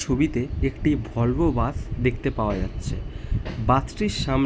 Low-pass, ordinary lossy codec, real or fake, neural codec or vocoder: none; none; real; none